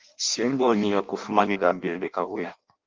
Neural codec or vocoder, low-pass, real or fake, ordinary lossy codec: codec, 16 kHz in and 24 kHz out, 0.6 kbps, FireRedTTS-2 codec; 7.2 kHz; fake; Opus, 32 kbps